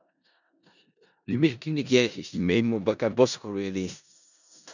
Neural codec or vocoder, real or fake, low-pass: codec, 16 kHz in and 24 kHz out, 0.4 kbps, LongCat-Audio-Codec, four codebook decoder; fake; 7.2 kHz